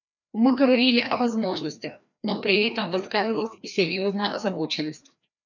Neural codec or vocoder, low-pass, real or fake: codec, 16 kHz, 1 kbps, FreqCodec, larger model; 7.2 kHz; fake